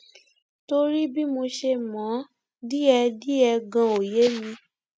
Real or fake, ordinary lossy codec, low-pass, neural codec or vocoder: real; none; none; none